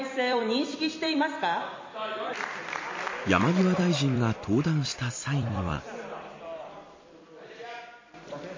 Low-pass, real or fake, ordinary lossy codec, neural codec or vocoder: 7.2 kHz; fake; MP3, 32 kbps; vocoder, 44.1 kHz, 128 mel bands every 256 samples, BigVGAN v2